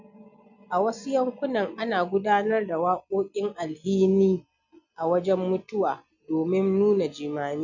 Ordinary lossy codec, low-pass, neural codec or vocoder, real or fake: none; 7.2 kHz; none; real